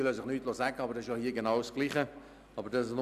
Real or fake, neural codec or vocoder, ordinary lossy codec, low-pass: real; none; none; 14.4 kHz